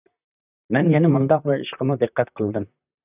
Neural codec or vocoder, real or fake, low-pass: vocoder, 44.1 kHz, 128 mel bands, Pupu-Vocoder; fake; 3.6 kHz